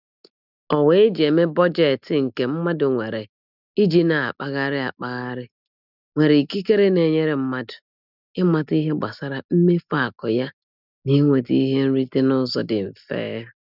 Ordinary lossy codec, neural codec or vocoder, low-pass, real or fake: none; none; 5.4 kHz; real